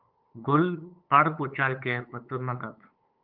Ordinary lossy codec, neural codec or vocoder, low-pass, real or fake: Opus, 24 kbps; codec, 16 kHz, 8 kbps, FunCodec, trained on LibriTTS, 25 frames a second; 5.4 kHz; fake